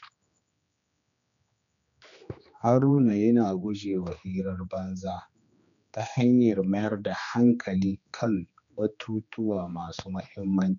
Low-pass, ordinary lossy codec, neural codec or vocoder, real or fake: 7.2 kHz; none; codec, 16 kHz, 2 kbps, X-Codec, HuBERT features, trained on general audio; fake